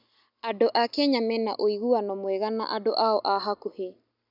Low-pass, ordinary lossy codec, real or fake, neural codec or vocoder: 5.4 kHz; none; real; none